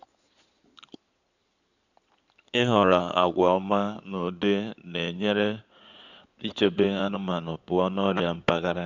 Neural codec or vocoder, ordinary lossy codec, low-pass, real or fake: codec, 16 kHz in and 24 kHz out, 2.2 kbps, FireRedTTS-2 codec; none; 7.2 kHz; fake